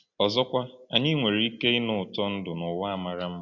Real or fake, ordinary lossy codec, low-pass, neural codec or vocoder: real; AAC, 48 kbps; 7.2 kHz; none